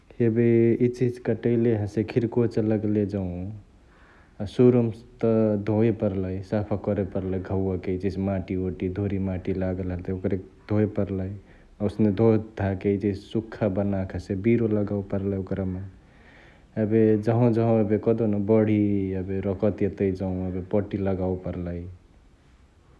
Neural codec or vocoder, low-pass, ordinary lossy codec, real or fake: none; none; none; real